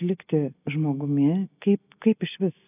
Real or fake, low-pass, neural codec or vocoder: real; 3.6 kHz; none